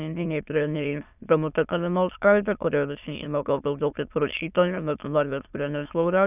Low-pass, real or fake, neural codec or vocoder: 3.6 kHz; fake; autoencoder, 22.05 kHz, a latent of 192 numbers a frame, VITS, trained on many speakers